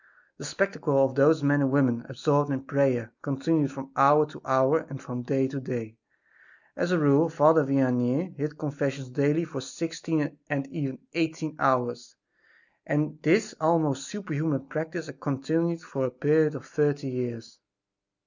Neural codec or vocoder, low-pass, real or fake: none; 7.2 kHz; real